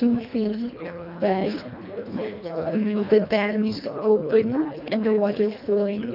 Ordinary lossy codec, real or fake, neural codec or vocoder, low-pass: none; fake; codec, 24 kHz, 1.5 kbps, HILCodec; 5.4 kHz